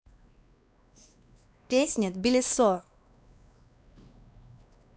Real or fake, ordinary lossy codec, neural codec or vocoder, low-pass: fake; none; codec, 16 kHz, 2 kbps, X-Codec, WavLM features, trained on Multilingual LibriSpeech; none